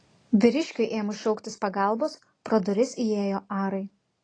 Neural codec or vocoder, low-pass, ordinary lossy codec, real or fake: none; 9.9 kHz; AAC, 32 kbps; real